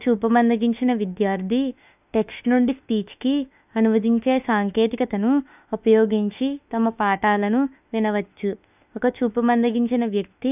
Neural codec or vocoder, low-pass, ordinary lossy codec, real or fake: autoencoder, 48 kHz, 32 numbers a frame, DAC-VAE, trained on Japanese speech; 3.6 kHz; none; fake